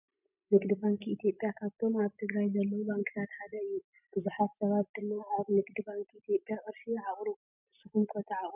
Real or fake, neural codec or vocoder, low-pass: real; none; 3.6 kHz